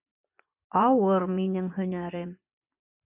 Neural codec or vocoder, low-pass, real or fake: vocoder, 24 kHz, 100 mel bands, Vocos; 3.6 kHz; fake